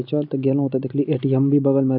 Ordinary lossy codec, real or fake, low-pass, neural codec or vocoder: none; real; 5.4 kHz; none